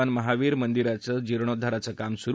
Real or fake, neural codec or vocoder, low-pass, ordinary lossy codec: real; none; none; none